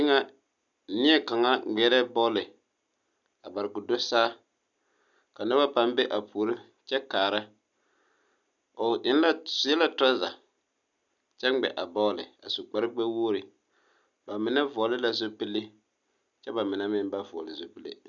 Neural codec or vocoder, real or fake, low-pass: none; real; 7.2 kHz